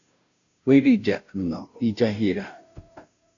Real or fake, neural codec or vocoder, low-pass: fake; codec, 16 kHz, 0.5 kbps, FunCodec, trained on Chinese and English, 25 frames a second; 7.2 kHz